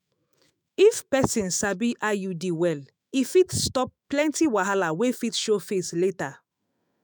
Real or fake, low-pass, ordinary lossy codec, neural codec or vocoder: fake; none; none; autoencoder, 48 kHz, 128 numbers a frame, DAC-VAE, trained on Japanese speech